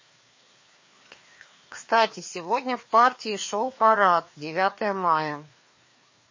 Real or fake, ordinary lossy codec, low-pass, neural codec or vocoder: fake; MP3, 32 kbps; 7.2 kHz; codec, 16 kHz, 2 kbps, FreqCodec, larger model